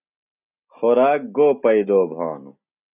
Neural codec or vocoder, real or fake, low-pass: none; real; 3.6 kHz